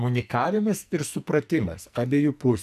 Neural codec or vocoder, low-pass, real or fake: codec, 44.1 kHz, 2.6 kbps, SNAC; 14.4 kHz; fake